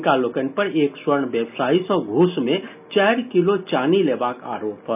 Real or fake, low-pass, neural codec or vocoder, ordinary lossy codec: real; 3.6 kHz; none; none